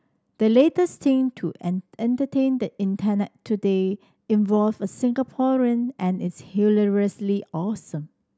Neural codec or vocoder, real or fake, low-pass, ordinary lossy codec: none; real; none; none